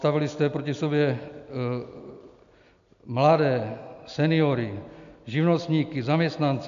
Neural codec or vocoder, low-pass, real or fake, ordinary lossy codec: none; 7.2 kHz; real; AAC, 96 kbps